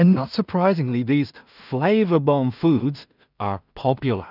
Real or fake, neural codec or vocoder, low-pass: fake; codec, 16 kHz in and 24 kHz out, 0.4 kbps, LongCat-Audio-Codec, two codebook decoder; 5.4 kHz